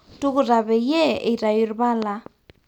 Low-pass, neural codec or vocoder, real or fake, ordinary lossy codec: 19.8 kHz; none; real; none